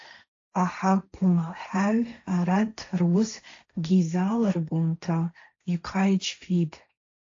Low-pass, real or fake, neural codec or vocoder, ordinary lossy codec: 7.2 kHz; fake; codec, 16 kHz, 1.1 kbps, Voila-Tokenizer; AAC, 48 kbps